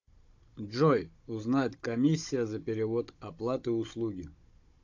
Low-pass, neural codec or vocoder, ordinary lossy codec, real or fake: 7.2 kHz; codec, 16 kHz, 16 kbps, FunCodec, trained on Chinese and English, 50 frames a second; AAC, 48 kbps; fake